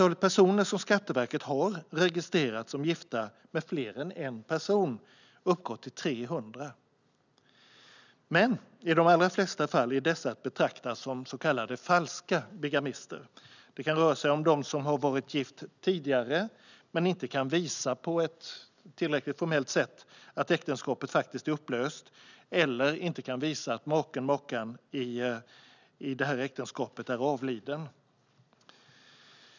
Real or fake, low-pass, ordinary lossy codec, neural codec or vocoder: real; 7.2 kHz; none; none